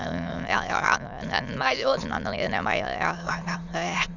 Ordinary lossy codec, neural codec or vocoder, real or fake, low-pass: none; autoencoder, 22.05 kHz, a latent of 192 numbers a frame, VITS, trained on many speakers; fake; 7.2 kHz